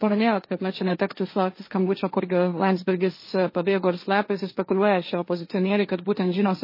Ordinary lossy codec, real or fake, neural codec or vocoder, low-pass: MP3, 24 kbps; fake; codec, 16 kHz, 1.1 kbps, Voila-Tokenizer; 5.4 kHz